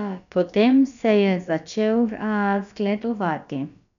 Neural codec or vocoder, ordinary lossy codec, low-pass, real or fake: codec, 16 kHz, about 1 kbps, DyCAST, with the encoder's durations; none; 7.2 kHz; fake